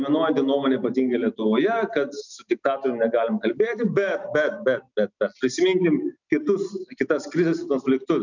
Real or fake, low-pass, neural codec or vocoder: real; 7.2 kHz; none